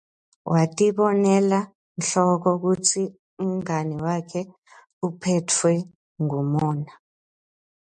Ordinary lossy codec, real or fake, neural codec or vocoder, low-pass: MP3, 64 kbps; real; none; 9.9 kHz